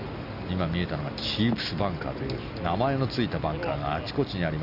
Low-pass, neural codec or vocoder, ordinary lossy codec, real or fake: 5.4 kHz; none; AAC, 48 kbps; real